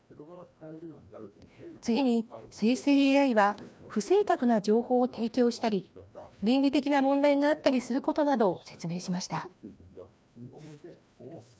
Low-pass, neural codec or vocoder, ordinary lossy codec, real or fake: none; codec, 16 kHz, 1 kbps, FreqCodec, larger model; none; fake